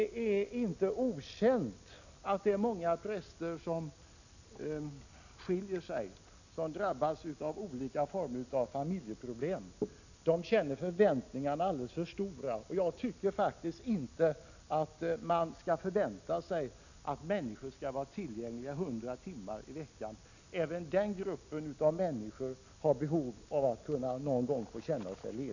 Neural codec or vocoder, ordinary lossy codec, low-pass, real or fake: none; none; 7.2 kHz; real